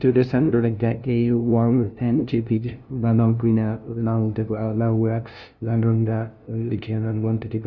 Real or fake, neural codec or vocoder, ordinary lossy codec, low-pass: fake; codec, 16 kHz, 0.5 kbps, FunCodec, trained on LibriTTS, 25 frames a second; none; 7.2 kHz